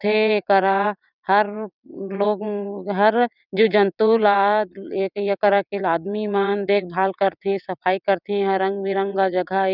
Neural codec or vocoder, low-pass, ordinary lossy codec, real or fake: vocoder, 22.05 kHz, 80 mel bands, WaveNeXt; 5.4 kHz; none; fake